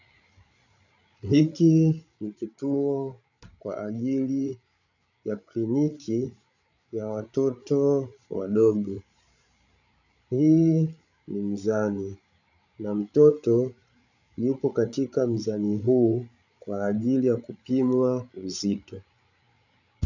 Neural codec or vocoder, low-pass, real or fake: codec, 16 kHz, 8 kbps, FreqCodec, larger model; 7.2 kHz; fake